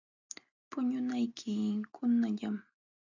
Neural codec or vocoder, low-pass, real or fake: none; 7.2 kHz; real